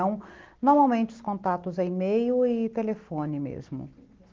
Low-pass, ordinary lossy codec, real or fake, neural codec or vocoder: 7.2 kHz; Opus, 16 kbps; real; none